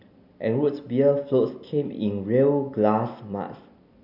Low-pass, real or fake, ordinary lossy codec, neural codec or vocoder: 5.4 kHz; real; none; none